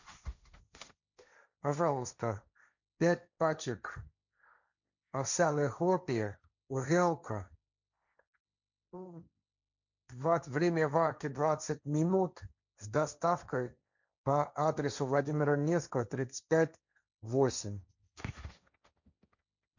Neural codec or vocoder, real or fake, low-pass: codec, 16 kHz, 1.1 kbps, Voila-Tokenizer; fake; 7.2 kHz